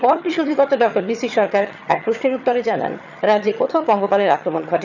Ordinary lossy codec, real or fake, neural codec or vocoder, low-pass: none; fake; vocoder, 22.05 kHz, 80 mel bands, HiFi-GAN; 7.2 kHz